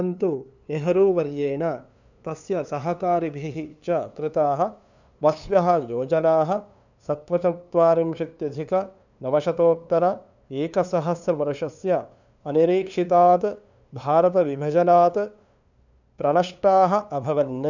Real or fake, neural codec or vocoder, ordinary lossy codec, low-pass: fake; codec, 16 kHz, 2 kbps, FunCodec, trained on LibriTTS, 25 frames a second; none; 7.2 kHz